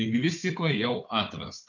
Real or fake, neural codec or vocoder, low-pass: fake; codec, 16 kHz, 4 kbps, FunCodec, trained on Chinese and English, 50 frames a second; 7.2 kHz